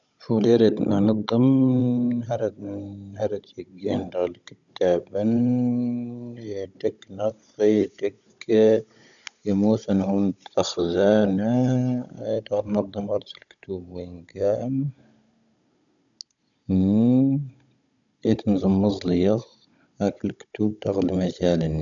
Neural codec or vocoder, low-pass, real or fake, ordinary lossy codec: codec, 16 kHz, 16 kbps, FunCodec, trained on Chinese and English, 50 frames a second; 7.2 kHz; fake; none